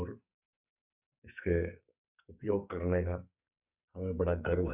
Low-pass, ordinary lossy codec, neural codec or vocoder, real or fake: 3.6 kHz; none; codec, 44.1 kHz, 2.6 kbps, SNAC; fake